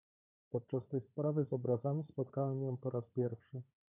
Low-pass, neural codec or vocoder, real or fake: 3.6 kHz; codec, 16 kHz, 4 kbps, FunCodec, trained on Chinese and English, 50 frames a second; fake